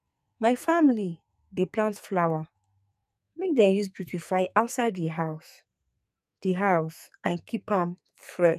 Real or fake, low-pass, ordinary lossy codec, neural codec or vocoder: fake; 14.4 kHz; none; codec, 44.1 kHz, 2.6 kbps, SNAC